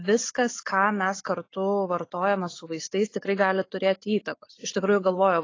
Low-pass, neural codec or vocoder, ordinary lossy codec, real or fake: 7.2 kHz; none; AAC, 32 kbps; real